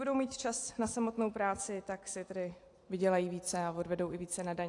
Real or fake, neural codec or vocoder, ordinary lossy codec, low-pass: real; none; AAC, 48 kbps; 10.8 kHz